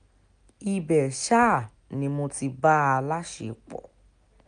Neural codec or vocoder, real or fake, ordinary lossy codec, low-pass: none; real; none; 9.9 kHz